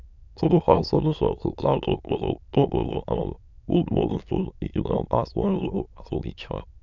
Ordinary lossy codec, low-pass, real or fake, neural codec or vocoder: none; 7.2 kHz; fake; autoencoder, 22.05 kHz, a latent of 192 numbers a frame, VITS, trained on many speakers